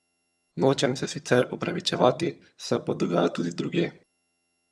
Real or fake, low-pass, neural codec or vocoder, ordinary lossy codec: fake; none; vocoder, 22.05 kHz, 80 mel bands, HiFi-GAN; none